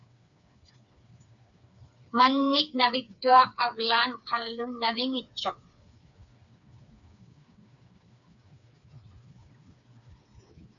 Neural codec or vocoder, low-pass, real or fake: codec, 16 kHz, 4 kbps, FreqCodec, smaller model; 7.2 kHz; fake